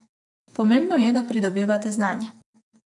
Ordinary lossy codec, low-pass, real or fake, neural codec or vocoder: none; 10.8 kHz; fake; codec, 44.1 kHz, 2.6 kbps, SNAC